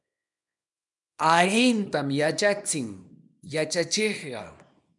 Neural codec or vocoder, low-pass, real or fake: codec, 24 kHz, 0.9 kbps, WavTokenizer, small release; 10.8 kHz; fake